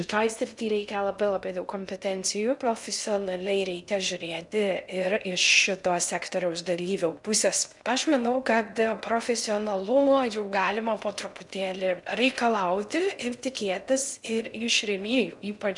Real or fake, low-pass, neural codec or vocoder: fake; 10.8 kHz; codec, 16 kHz in and 24 kHz out, 0.6 kbps, FocalCodec, streaming, 2048 codes